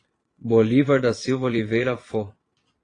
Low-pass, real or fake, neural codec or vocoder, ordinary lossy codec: 9.9 kHz; fake; vocoder, 22.05 kHz, 80 mel bands, Vocos; AAC, 32 kbps